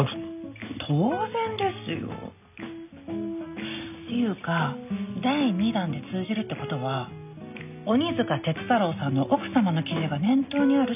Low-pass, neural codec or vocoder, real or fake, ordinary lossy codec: 3.6 kHz; none; real; none